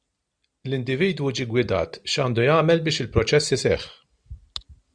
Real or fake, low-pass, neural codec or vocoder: real; 9.9 kHz; none